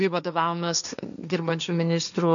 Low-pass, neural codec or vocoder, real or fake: 7.2 kHz; codec, 16 kHz, 1.1 kbps, Voila-Tokenizer; fake